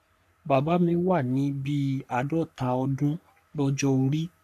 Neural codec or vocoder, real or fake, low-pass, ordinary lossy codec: codec, 44.1 kHz, 3.4 kbps, Pupu-Codec; fake; 14.4 kHz; none